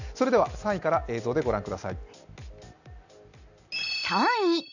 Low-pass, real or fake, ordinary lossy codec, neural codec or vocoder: 7.2 kHz; real; none; none